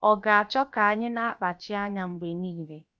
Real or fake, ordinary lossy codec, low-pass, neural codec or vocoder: fake; none; none; codec, 16 kHz, about 1 kbps, DyCAST, with the encoder's durations